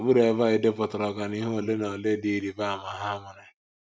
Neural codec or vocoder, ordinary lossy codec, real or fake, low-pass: none; none; real; none